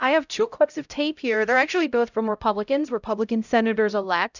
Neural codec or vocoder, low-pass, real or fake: codec, 16 kHz, 0.5 kbps, X-Codec, HuBERT features, trained on LibriSpeech; 7.2 kHz; fake